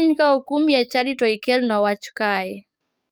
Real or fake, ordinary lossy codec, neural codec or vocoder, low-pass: fake; none; codec, 44.1 kHz, 7.8 kbps, DAC; none